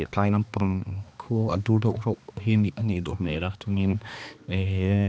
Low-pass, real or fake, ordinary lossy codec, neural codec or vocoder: none; fake; none; codec, 16 kHz, 2 kbps, X-Codec, HuBERT features, trained on balanced general audio